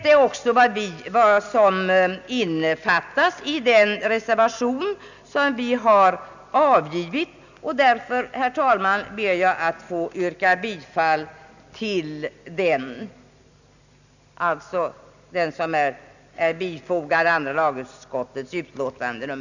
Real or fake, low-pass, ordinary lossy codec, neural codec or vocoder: real; 7.2 kHz; none; none